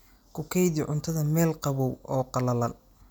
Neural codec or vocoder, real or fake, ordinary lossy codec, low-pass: none; real; none; none